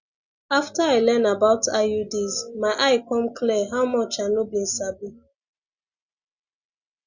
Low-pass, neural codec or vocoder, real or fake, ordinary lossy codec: 7.2 kHz; none; real; Opus, 64 kbps